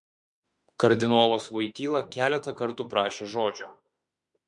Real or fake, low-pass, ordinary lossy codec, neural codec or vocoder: fake; 10.8 kHz; MP3, 64 kbps; autoencoder, 48 kHz, 32 numbers a frame, DAC-VAE, trained on Japanese speech